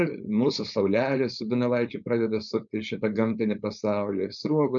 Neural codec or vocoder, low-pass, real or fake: codec, 16 kHz, 4.8 kbps, FACodec; 7.2 kHz; fake